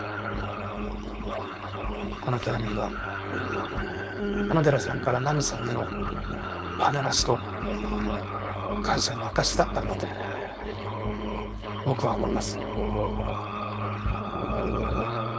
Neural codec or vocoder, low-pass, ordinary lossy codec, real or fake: codec, 16 kHz, 4.8 kbps, FACodec; none; none; fake